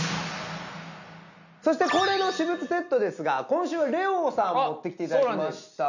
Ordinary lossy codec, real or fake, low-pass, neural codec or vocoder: none; real; 7.2 kHz; none